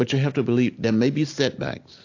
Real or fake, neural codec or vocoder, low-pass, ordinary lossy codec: real; none; 7.2 kHz; AAC, 48 kbps